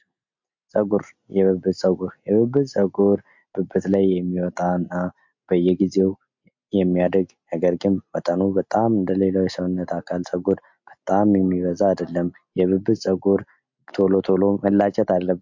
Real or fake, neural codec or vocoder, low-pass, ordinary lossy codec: real; none; 7.2 kHz; MP3, 48 kbps